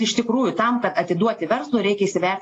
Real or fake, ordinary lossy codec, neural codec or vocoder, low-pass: real; AAC, 32 kbps; none; 9.9 kHz